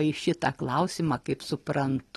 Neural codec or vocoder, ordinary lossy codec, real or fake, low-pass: none; AAC, 32 kbps; real; 19.8 kHz